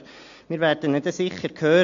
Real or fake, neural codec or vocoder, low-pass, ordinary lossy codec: real; none; 7.2 kHz; AAC, 48 kbps